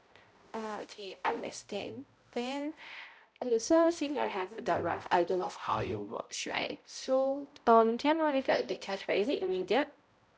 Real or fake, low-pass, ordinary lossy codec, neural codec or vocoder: fake; none; none; codec, 16 kHz, 0.5 kbps, X-Codec, HuBERT features, trained on balanced general audio